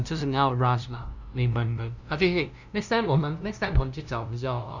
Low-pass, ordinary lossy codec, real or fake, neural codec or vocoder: 7.2 kHz; none; fake; codec, 16 kHz, 0.5 kbps, FunCodec, trained on LibriTTS, 25 frames a second